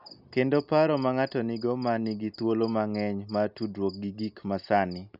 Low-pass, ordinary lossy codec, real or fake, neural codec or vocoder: 5.4 kHz; none; real; none